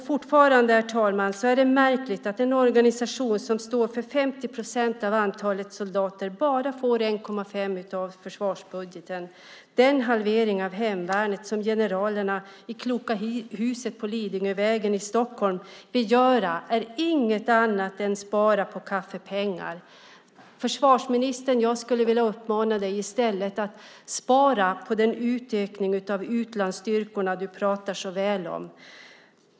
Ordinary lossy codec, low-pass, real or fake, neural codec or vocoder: none; none; real; none